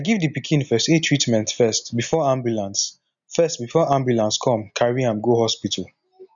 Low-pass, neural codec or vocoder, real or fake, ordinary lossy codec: 7.2 kHz; none; real; none